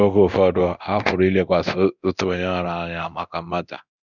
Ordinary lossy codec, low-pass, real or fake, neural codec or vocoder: none; 7.2 kHz; fake; codec, 24 kHz, 0.9 kbps, DualCodec